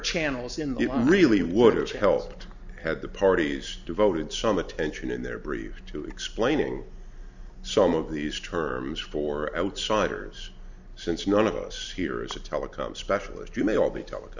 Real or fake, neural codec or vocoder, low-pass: real; none; 7.2 kHz